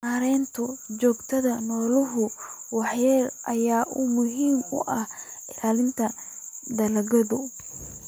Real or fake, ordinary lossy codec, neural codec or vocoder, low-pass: real; none; none; none